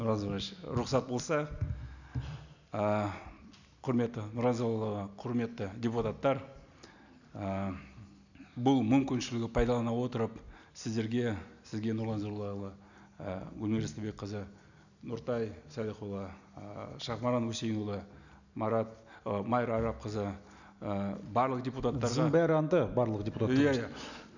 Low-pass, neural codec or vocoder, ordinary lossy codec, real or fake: 7.2 kHz; none; none; real